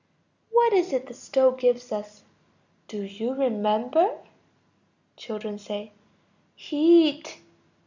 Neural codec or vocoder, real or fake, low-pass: none; real; 7.2 kHz